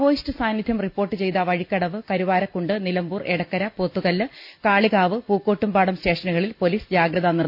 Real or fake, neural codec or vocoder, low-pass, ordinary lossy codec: real; none; 5.4 kHz; none